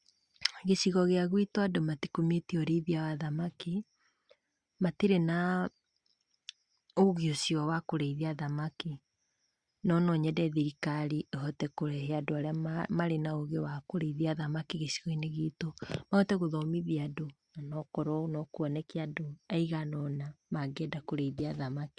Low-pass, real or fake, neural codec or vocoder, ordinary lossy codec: 9.9 kHz; real; none; Opus, 64 kbps